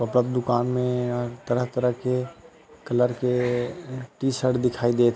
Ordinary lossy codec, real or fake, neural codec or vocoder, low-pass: none; real; none; none